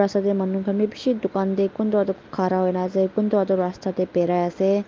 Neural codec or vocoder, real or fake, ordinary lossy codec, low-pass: none; real; Opus, 24 kbps; 7.2 kHz